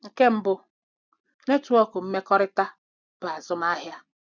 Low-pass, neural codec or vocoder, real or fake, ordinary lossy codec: 7.2 kHz; none; real; none